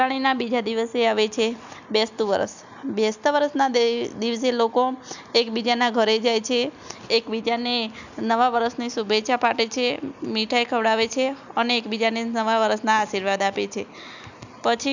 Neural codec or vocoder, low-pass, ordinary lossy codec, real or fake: none; 7.2 kHz; none; real